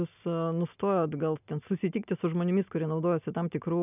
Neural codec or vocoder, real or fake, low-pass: none; real; 3.6 kHz